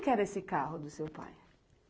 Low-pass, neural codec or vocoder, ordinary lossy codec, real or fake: none; none; none; real